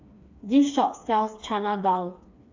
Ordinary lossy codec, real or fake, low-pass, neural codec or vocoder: AAC, 48 kbps; fake; 7.2 kHz; codec, 16 kHz, 4 kbps, FreqCodec, smaller model